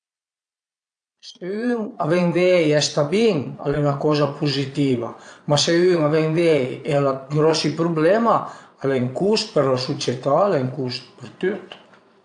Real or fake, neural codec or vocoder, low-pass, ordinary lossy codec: fake; vocoder, 22.05 kHz, 80 mel bands, Vocos; 9.9 kHz; AAC, 64 kbps